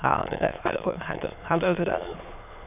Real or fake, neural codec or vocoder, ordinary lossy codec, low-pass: fake; autoencoder, 22.05 kHz, a latent of 192 numbers a frame, VITS, trained on many speakers; none; 3.6 kHz